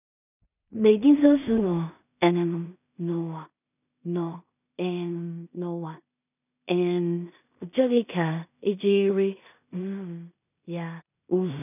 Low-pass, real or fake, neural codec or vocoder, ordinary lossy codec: 3.6 kHz; fake; codec, 16 kHz in and 24 kHz out, 0.4 kbps, LongCat-Audio-Codec, two codebook decoder; none